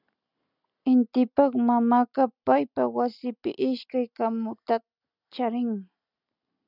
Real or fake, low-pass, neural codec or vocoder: real; 5.4 kHz; none